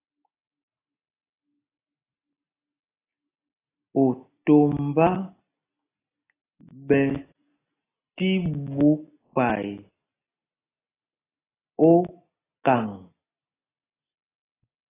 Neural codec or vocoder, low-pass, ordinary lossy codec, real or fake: none; 3.6 kHz; AAC, 16 kbps; real